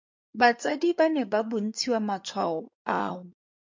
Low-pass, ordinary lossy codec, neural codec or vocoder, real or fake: 7.2 kHz; MP3, 48 kbps; codec, 16 kHz in and 24 kHz out, 2.2 kbps, FireRedTTS-2 codec; fake